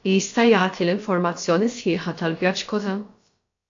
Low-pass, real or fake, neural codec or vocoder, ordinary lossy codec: 7.2 kHz; fake; codec, 16 kHz, about 1 kbps, DyCAST, with the encoder's durations; AAC, 48 kbps